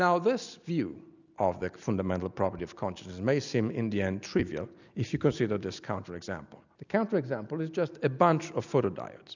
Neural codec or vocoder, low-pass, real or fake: none; 7.2 kHz; real